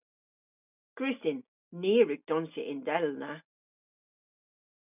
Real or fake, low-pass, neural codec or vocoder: real; 3.6 kHz; none